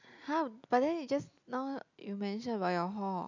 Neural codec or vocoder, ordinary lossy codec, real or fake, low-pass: none; none; real; 7.2 kHz